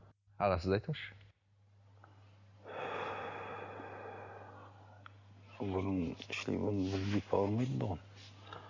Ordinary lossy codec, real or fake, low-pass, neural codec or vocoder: none; real; 7.2 kHz; none